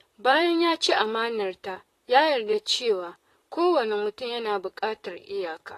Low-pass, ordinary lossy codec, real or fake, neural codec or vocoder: 14.4 kHz; AAC, 48 kbps; fake; vocoder, 44.1 kHz, 128 mel bands, Pupu-Vocoder